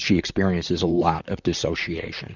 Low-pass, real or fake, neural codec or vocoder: 7.2 kHz; fake; vocoder, 44.1 kHz, 128 mel bands, Pupu-Vocoder